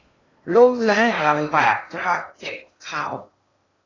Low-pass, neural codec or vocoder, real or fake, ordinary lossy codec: 7.2 kHz; codec, 16 kHz in and 24 kHz out, 0.8 kbps, FocalCodec, streaming, 65536 codes; fake; AAC, 32 kbps